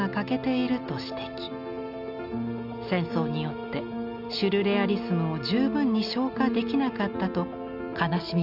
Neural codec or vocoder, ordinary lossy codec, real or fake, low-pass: none; Opus, 64 kbps; real; 5.4 kHz